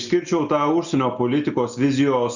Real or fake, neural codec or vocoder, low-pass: real; none; 7.2 kHz